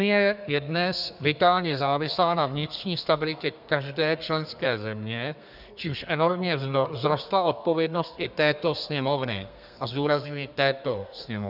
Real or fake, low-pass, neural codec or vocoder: fake; 5.4 kHz; codec, 32 kHz, 1.9 kbps, SNAC